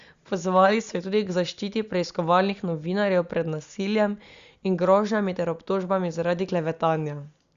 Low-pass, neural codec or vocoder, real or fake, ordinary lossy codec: 7.2 kHz; none; real; Opus, 64 kbps